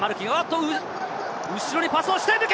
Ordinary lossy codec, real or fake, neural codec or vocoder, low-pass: none; real; none; none